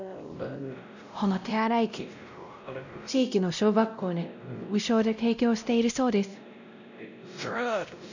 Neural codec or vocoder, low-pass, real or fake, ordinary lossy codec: codec, 16 kHz, 0.5 kbps, X-Codec, WavLM features, trained on Multilingual LibriSpeech; 7.2 kHz; fake; none